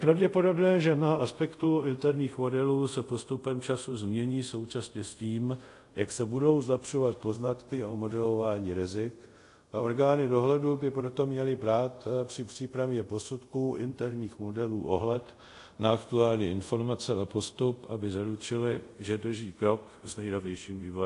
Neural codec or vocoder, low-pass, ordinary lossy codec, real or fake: codec, 24 kHz, 0.5 kbps, DualCodec; 10.8 kHz; AAC, 48 kbps; fake